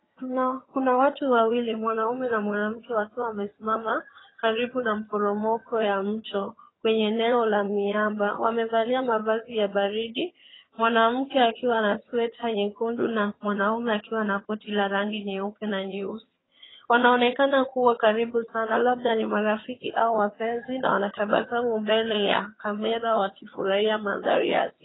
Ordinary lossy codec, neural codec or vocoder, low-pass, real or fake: AAC, 16 kbps; vocoder, 22.05 kHz, 80 mel bands, HiFi-GAN; 7.2 kHz; fake